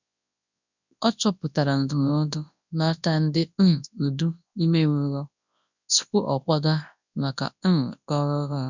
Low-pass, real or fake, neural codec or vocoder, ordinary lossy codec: 7.2 kHz; fake; codec, 24 kHz, 0.9 kbps, WavTokenizer, large speech release; none